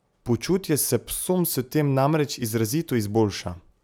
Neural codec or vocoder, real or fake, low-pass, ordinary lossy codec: none; real; none; none